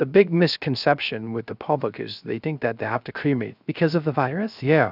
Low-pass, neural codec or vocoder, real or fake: 5.4 kHz; codec, 16 kHz, 0.3 kbps, FocalCodec; fake